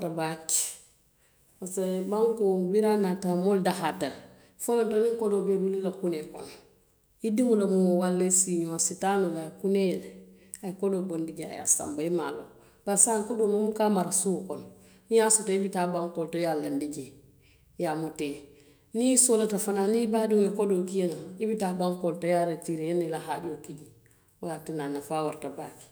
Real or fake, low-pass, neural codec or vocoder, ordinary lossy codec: fake; none; autoencoder, 48 kHz, 128 numbers a frame, DAC-VAE, trained on Japanese speech; none